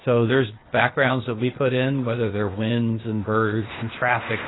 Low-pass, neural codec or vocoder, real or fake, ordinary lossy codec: 7.2 kHz; codec, 16 kHz, 0.8 kbps, ZipCodec; fake; AAC, 16 kbps